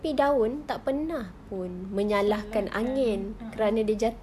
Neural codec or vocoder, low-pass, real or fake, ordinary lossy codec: none; 14.4 kHz; real; none